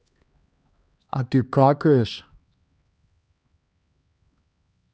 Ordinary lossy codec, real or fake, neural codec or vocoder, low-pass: none; fake; codec, 16 kHz, 2 kbps, X-Codec, HuBERT features, trained on LibriSpeech; none